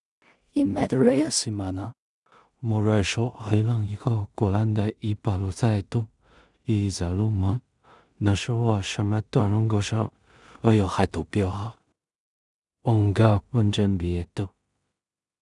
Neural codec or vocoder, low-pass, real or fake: codec, 16 kHz in and 24 kHz out, 0.4 kbps, LongCat-Audio-Codec, two codebook decoder; 10.8 kHz; fake